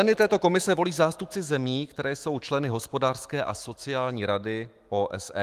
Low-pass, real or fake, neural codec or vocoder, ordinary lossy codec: 14.4 kHz; fake; autoencoder, 48 kHz, 128 numbers a frame, DAC-VAE, trained on Japanese speech; Opus, 24 kbps